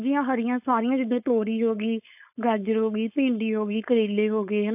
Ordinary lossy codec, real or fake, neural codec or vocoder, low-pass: AAC, 32 kbps; fake; codec, 16 kHz, 4.8 kbps, FACodec; 3.6 kHz